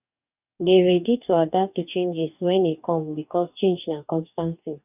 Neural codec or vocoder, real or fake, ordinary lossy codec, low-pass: codec, 44.1 kHz, 2.6 kbps, DAC; fake; none; 3.6 kHz